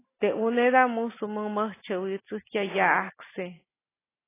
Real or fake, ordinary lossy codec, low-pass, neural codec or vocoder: real; AAC, 16 kbps; 3.6 kHz; none